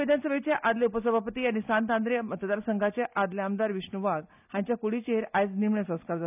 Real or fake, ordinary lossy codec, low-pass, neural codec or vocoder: real; none; 3.6 kHz; none